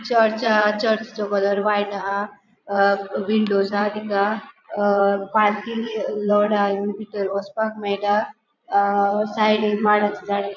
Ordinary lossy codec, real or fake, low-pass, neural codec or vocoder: none; fake; 7.2 kHz; vocoder, 22.05 kHz, 80 mel bands, Vocos